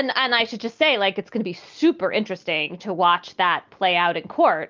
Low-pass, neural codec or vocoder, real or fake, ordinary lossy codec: 7.2 kHz; none; real; Opus, 24 kbps